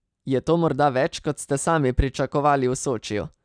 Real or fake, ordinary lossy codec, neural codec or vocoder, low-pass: real; none; none; 9.9 kHz